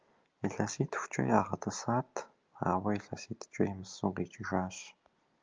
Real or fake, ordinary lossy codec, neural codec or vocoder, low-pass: real; Opus, 24 kbps; none; 7.2 kHz